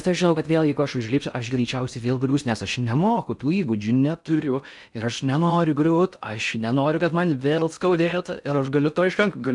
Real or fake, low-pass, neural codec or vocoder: fake; 10.8 kHz; codec, 16 kHz in and 24 kHz out, 0.6 kbps, FocalCodec, streaming, 4096 codes